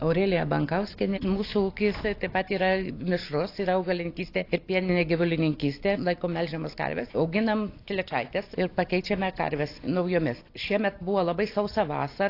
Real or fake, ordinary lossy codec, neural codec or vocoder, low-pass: real; AAC, 32 kbps; none; 5.4 kHz